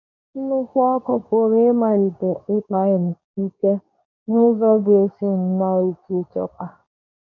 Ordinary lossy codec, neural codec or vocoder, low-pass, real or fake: none; codec, 24 kHz, 0.9 kbps, WavTokenizer, medium speech release version 2; 7.2 kHz; fake